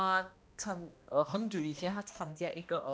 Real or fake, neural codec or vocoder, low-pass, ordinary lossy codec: fake; codec, 16 kHz, 1 kbps, X-Codec, HuBERT features, trained on balanced general audio; none; none